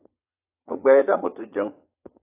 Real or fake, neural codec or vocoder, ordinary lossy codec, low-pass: fake; vocoder, 22.05 kHz, 80 mel bands, Vocos; AAC, 16 kbps; 3.6 kHz